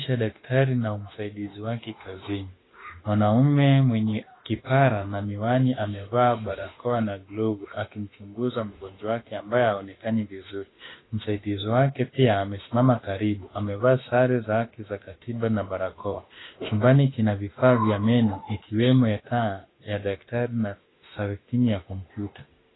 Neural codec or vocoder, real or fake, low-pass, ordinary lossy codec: autoencoder, 48 kHz, 32 numbers a frame, DAC-VAE, trained on Japanese speech; fake; 7.2 kHz; AAC, 16 kbps